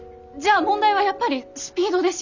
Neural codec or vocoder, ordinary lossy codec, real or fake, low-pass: none; none; real; 7.2 kHz